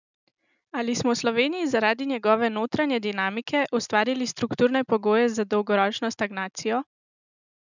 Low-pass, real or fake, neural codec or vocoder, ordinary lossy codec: 7.2 kHz; real; none; none